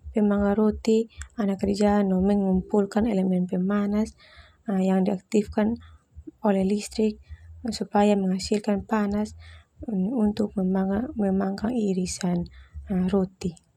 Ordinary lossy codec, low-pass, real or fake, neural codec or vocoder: none; 19.8 kHz; real; none